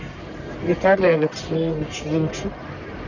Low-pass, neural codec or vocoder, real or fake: 7.2 kHz; codec, 44.1 kHz, 1.7 kbps, Pupu-Codec; fake